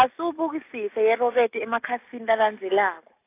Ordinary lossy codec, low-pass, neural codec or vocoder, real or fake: AAC, 24 kbps; 3.6 kHz; none; real